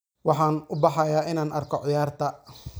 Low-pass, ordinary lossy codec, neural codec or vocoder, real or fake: none; none; none; real